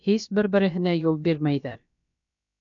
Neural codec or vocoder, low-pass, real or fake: codec, 16 kHz, about 1 kbps, DyCAST, with the encoder's durations; 7.2 kHz; fake